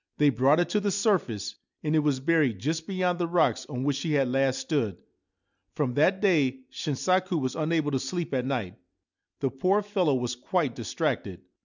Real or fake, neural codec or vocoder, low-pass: real; none; 7.2 kHz